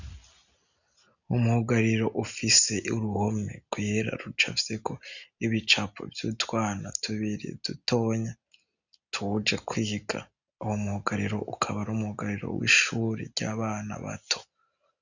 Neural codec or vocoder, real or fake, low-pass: none; real; 7.2 kHz